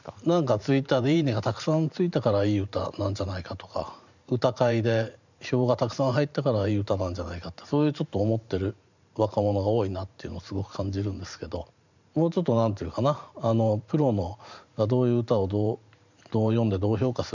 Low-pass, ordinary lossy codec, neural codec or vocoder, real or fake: 7.2 kHz; none; vocoder, 44.1 kHz, 128 mel bands every 512 samples, BigVGAN v2; fake